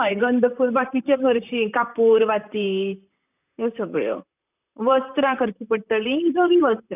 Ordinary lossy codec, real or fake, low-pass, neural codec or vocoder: none; fake; 3.6 kHz; vocoder, 44.1 kHz, 128 mel bands, Pupu-Vocoder